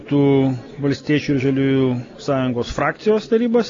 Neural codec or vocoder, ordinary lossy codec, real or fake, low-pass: none; AAC, 32 kbps; real; 7.2 kHz